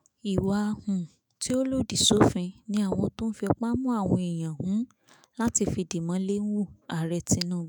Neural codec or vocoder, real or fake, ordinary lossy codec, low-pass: autoencoder, 48 kHz, 128 numbers a frame, DAC-VAE, trained on Japanese speech; fake; none; none